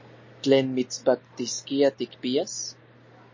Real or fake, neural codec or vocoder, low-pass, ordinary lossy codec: real; none; 7.2 kHz; MP3, 32 kbps